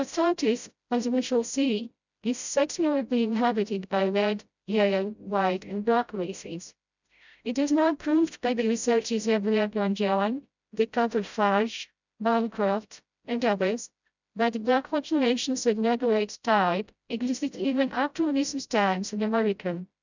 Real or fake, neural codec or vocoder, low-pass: fake; codec, 16 kHz, 0.5 kbps, FreqCodec, smaller model; 7.2 kHz